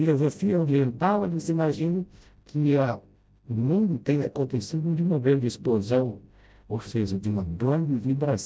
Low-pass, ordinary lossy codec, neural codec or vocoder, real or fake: none; none; codec, 16 kHz, 0.5 kbps, FreqCodec, smaller model; fake